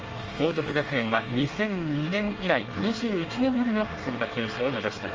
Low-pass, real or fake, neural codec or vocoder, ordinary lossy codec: 7.2 kHz; fake; codec, 24 kHz, 1 kbps, SNAC; Opus, 24 kbps